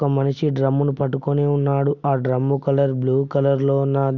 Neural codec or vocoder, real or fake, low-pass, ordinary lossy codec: none; real; 7.2 kHz; none